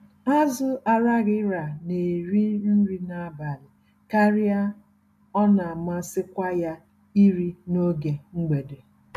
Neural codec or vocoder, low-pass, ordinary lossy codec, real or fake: none; 14.4 kHz; none; real